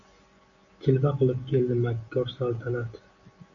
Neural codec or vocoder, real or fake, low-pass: none; real; 7.2 kHz